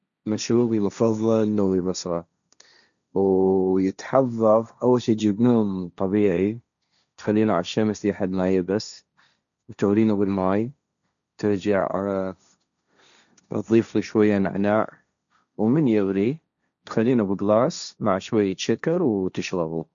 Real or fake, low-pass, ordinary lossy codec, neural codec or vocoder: fake; 7.2 kHz; none; codec, 16 kHz, 1.1 kbps, Voila-Tokenizer